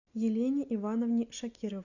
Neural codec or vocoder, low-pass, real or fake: none; 7.2 kHz; real